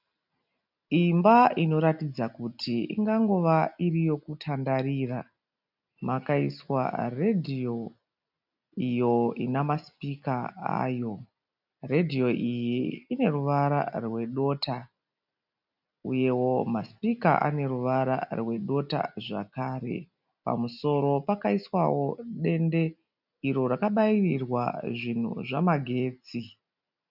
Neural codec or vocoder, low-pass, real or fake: none; 5.4 kHz; real